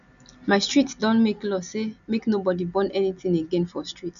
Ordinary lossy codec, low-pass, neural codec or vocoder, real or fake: none; 7.2 kHz; none; real